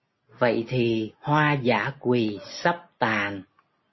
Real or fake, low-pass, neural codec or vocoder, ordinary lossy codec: real; 7.2 kHz; none; MP3, 24 kbps